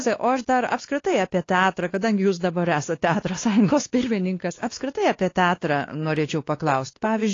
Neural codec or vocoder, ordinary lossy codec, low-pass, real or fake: codec, 16 kHz, 2 kbps, X-Codec, WavLM features, trained on Multilingual LibriSpeech; AAC, 32 kbps; 7.2 kHz; fake